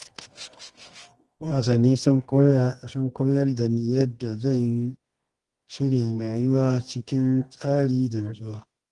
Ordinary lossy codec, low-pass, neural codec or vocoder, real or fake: Opus, 24 kbps; 10.8 kHz; codec, 24 kHz, 0.9 kbps, WavTokenizer, medium music audio release; fake